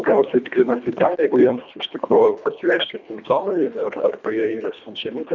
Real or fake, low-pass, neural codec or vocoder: fake; 7.2 kHz; codec, 24 kHz, 1.5 kbps, HILCodec